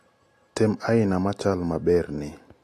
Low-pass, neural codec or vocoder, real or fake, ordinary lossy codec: 14.4 kHz; none; real; AAC, 48 kbps